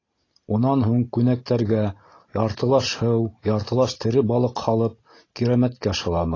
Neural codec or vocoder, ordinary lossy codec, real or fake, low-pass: none; AAC, 32 kbps; real; 7.2 kHz